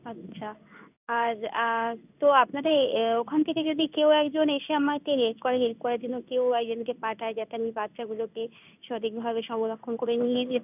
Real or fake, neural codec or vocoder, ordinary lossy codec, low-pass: fake; codec, 16 kHz in and 24 kHz out, 1 kbps, XY-Tokenizer; none; 3.6 kHz